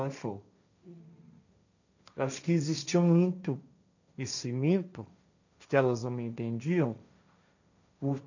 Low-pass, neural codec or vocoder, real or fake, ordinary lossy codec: 7.2 kHz; codec, 16 kHz, 1.1 kbps, Voila-Tokenizer; fake; none